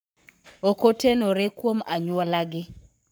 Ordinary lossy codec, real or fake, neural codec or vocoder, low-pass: none; fake; codec, 44.1 kHz, 7.8 kbps, Pupu-Codec; none